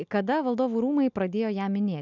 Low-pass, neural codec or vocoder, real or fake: 7.2 kHz; none; real